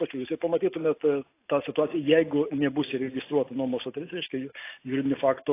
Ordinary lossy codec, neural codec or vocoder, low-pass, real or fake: AAC, 24 kbps; none; 3.6 kHz; real